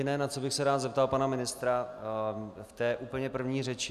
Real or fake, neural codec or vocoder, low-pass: real; none; 14.4 kHz